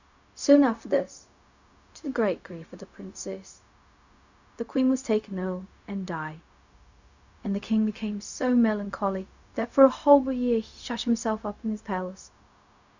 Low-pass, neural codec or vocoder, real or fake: 7.2 kHz; codec, 16 kHz, 0.4 kbps, LongCat-Audio-Codec; fake